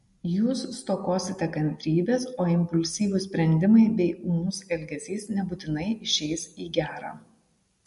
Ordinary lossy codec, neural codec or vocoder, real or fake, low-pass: MP3, 48 kbps; none; real; 14.4 kHz